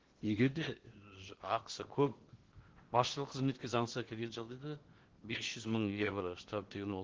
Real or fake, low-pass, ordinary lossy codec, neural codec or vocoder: fake; 7.2 kHz; Opus, 16 kbps; codec, 16 kHz in and 24 kHz out, 0.8 kbps, FocalCodec, streaming, 65536 codes